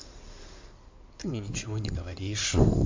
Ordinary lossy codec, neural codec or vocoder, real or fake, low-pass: MP3, 48 kbps; codec, 16 kHz in and 24 kHz out, 2.2 kbps, FireRedTTS-2 codec; fake; 7.2 kHz